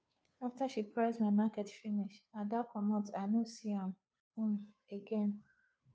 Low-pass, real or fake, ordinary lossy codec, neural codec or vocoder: none; fake; none; codec, 16 kHz, 2 kbps, FunCodec, trained on Chinese and English, 25 frames a second